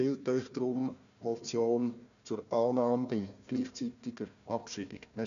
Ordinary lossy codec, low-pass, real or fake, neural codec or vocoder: none; 7.2 kHz; fake; codec, 16 kHz, 1 kbps, FunCodec, trained on Chinese and English, 50 frames a second